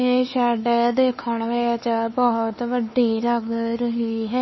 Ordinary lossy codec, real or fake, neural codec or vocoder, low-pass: MP3, 24 kbps; fake; codec, 16 kHz, 4 kbps, X-Codec, WavLM features, trained on Multilingual LibriSpeech; 7.2 kHz